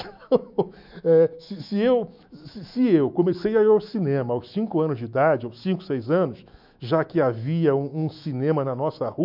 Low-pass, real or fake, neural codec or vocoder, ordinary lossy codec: 5.4 kHz; fake; autoencoder, 48 kHz, 128 numbers a frame, DAC-VAE, trained on Japanese speech; MP3, 48 kbps